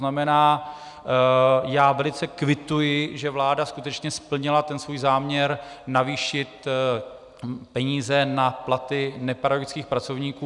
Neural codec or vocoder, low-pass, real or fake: none; 10.8 kHz; real